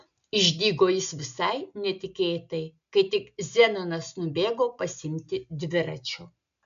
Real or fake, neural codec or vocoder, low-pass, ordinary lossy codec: real; none; 7.2 kHz; MP3, 64 kbps